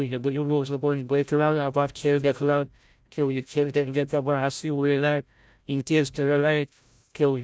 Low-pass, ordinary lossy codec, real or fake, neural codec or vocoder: none; none; fake; codec, 16 kHz, 0.5 kbps, FreqCodec, larger model